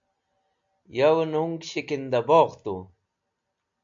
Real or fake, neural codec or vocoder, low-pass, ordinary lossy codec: real; none; 7.2 kHz; AAC, 64 kbps